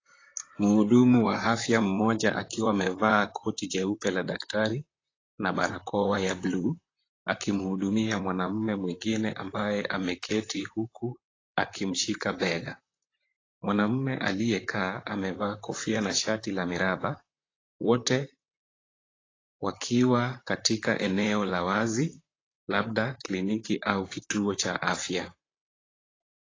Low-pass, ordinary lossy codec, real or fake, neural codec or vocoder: 7.2 kHz; AAC, 32 kbps; fake; vocoder, 44.1 kHz, 128 mel bands, Pupu-Vocoder